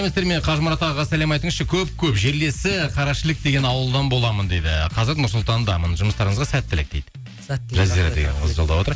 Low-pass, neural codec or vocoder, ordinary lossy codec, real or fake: none; none; none; real